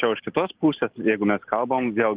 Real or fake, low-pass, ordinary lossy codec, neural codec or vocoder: real; 3.6 kHz; Opus, 16 kbps; none